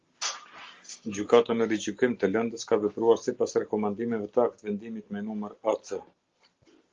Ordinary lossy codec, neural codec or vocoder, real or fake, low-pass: Opus, 32 kbps; none; real; 7.2 kHz